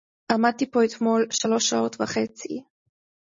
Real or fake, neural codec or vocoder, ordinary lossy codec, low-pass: real; none; MP3, 32 kbps; 7.2 kHz